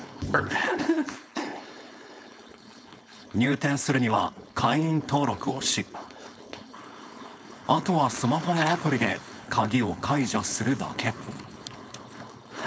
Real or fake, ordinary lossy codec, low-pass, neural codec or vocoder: fake; none; none; codec, 16 kHz, 4.8 kbps, FACodec